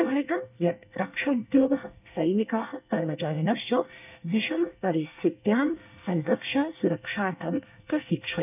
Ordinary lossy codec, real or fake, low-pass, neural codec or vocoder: none; fake; 3.6 kHz; codec, 24 kHz, 1 kbps, SNAC